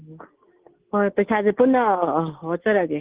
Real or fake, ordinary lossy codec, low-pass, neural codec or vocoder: real; Opus, 16 kbps; 3.6 kHz; none